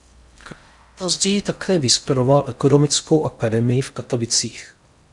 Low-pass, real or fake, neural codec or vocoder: 10.8 kHz; fake; codec, 16 kHz in and 24 kHz out, 0.6 kbps, FocalCodec, streaming, 4096 codes